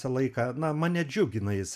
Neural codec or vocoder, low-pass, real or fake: none; 14.4 kHz; real